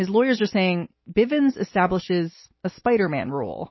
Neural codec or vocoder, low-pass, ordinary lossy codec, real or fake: none; 7.2 kHz; MP3, 24 kbps; real